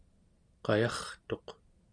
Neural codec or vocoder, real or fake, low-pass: none; real; 9.9 kHz